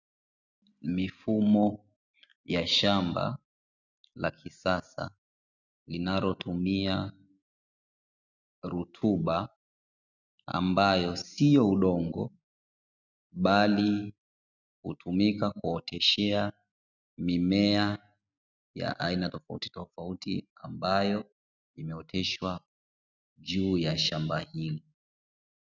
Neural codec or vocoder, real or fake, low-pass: none; real; 7.2 kHz